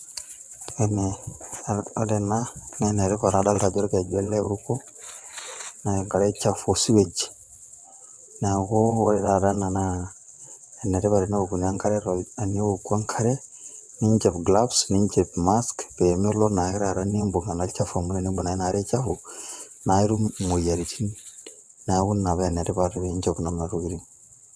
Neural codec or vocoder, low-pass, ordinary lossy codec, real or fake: vocoder, 22.05 kHz, 80 mel bands, WaveNeXt; none; none; fake